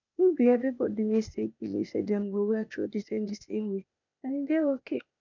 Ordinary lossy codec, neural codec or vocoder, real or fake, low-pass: none; codec, 16 kHz, 0.8 kbps, ZipCodec; fake; 7.2 kHz